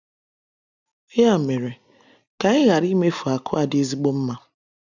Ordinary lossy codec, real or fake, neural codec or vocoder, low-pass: none; real; none; 7.2 kHz